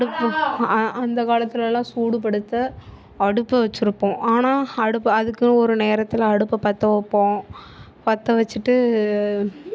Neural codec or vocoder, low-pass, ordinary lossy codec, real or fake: none; none; none; real